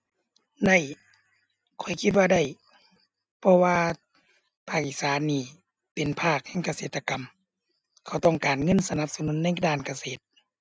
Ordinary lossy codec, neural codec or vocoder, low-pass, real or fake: none; none; none; real